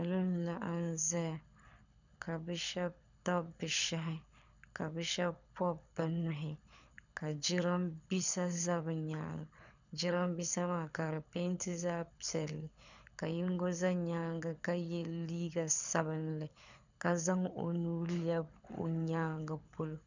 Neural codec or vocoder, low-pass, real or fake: codec, 24 kHz, 6 kbps, HILCodec; 7.2 kHz; fake